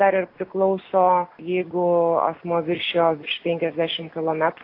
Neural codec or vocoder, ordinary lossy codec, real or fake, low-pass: none; AAC, 32 kbps; real; 5.4 kHz